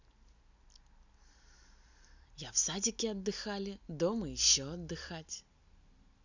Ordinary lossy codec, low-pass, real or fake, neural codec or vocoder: none; 7.2 kHz; real; none